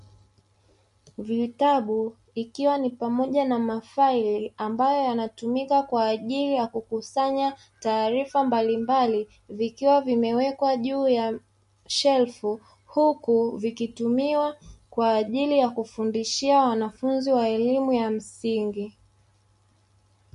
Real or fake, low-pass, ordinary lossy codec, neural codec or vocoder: real; 14.4 kHz; MP3, 48 kbps; none